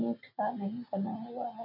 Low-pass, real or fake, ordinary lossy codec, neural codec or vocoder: 5.4 kHz; real; none; none